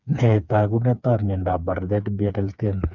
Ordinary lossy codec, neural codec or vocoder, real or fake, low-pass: none; codec, 16 kHz, 4 kbps, FreqCodec, smaller model; fake; 7.2 kHz